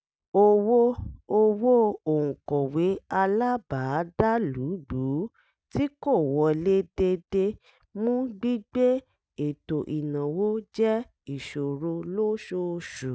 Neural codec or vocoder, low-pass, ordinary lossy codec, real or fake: none; none; none; real